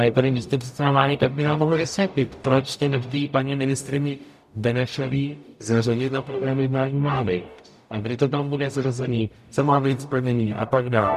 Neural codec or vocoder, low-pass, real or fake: codec, 44.1 kHz, 0.9 kbps, DAC; 14.4 kHz; fake